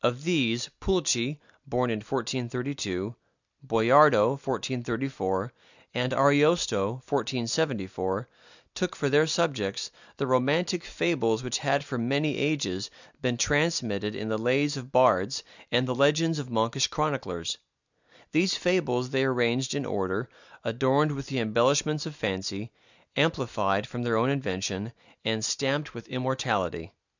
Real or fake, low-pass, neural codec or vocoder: real; 7.2 kHz; none